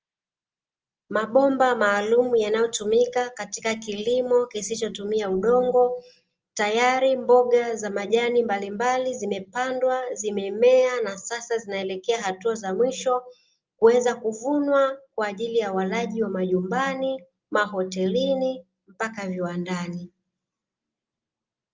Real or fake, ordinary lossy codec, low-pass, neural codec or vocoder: real; Opus, 24 kbps; 7.2 kHz; none